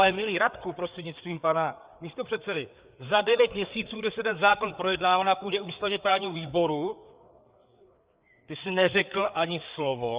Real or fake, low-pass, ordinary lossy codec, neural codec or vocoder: fake; 3.6 kHz; Opus, 64 kbps; codec, 16 kHz, 4 kbps, FreqCodec, larger model